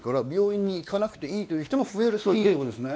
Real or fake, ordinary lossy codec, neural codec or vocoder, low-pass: fake; none; codec, 16 kHz, 2 kbps, X-Codec, WavLM features, trained on Multilingual LibriSpeech; none